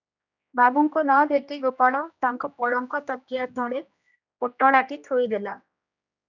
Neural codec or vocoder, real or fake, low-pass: codec, 16 kHz, 1 kbps, X-Codec, HuBERT features, trained on general audio; fake; 7.2 kHz